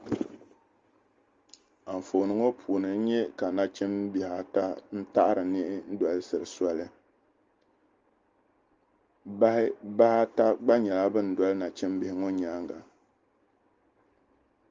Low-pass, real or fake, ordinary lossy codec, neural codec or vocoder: 7.2 kHz; real; Opus, 32 kbps; none